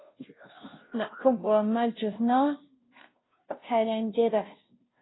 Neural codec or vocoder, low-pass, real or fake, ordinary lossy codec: codec, 16 kHz, 0.5 kbps, FunCodec, trained on Chinese and English, 25 frames a second; 7.2 kHz; fake; AAC, 16 kbps